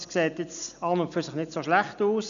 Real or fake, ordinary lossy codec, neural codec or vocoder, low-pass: real; none; none; 7.2 kHz